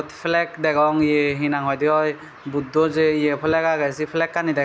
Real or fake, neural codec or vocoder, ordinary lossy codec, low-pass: real; none; none; none